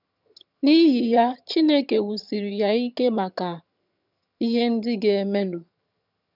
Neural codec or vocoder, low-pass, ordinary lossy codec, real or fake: vocoder, 22.05 kHz, 80 mel bands, HiFi-GAN; 5.4 kHz; none; fake